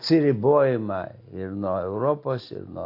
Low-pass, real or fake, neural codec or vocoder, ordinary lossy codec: 5.4 kHz; real; none; AAC, 32 kbps